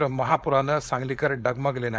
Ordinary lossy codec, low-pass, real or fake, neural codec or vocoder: none; none; fake; codec, 16 kHz, 4.8 kbps, FACodec